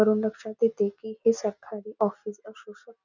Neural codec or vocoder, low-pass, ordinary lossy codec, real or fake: none; 7.2 kHz; none; real